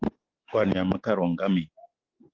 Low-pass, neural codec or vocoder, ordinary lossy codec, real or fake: 7.2 kHz; none; Opus, 16 kbps; real